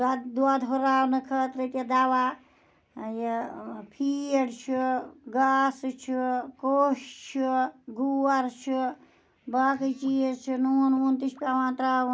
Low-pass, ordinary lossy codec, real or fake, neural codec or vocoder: none; none; real; none